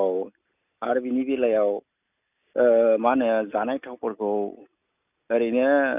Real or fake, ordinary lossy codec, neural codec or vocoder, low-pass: real; none; none; 3.6 kHz